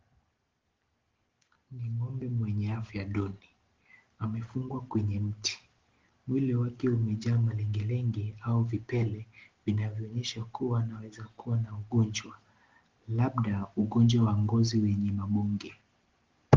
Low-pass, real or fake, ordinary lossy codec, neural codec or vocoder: 7.2 kHz; real; Opus, 16 kbps; none